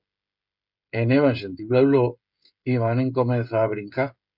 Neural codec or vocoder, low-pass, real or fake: codec, 16 kHz, 16 kbps, FreqCodec, smaller model; 5.4 kHz; fake